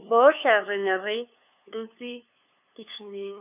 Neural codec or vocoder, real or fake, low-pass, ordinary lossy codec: codec, 16 kHz, 2 kbps, FunCodec, trained on LibriTTS, 25 frames a second; fake; 3.6 kHz; none